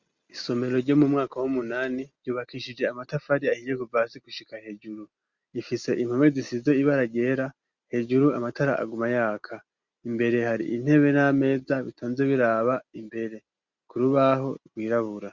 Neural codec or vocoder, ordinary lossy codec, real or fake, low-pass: none; Opus, 64 kbps; real; 7.2 kHz